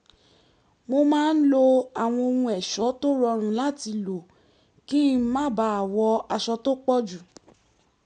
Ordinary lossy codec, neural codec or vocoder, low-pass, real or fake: none; none; 9.9 kHz; real